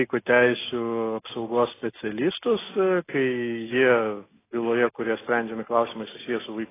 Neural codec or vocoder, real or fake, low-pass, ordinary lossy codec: none; real; 3.6 kHz; AAC, 16 kbps